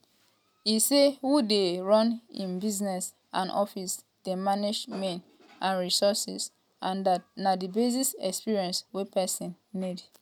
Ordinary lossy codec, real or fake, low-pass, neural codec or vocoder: none; real; 19.8 kHz; none